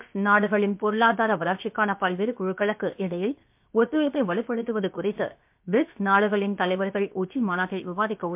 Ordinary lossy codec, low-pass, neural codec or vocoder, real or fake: MP3, 32 kbps; 3.6 kHz; codec, 16 kHz, 0.7 kbps, FocalCodec; fake